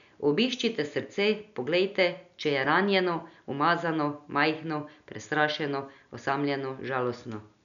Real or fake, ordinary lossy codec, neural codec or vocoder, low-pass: real; none; none; 7.2 kHz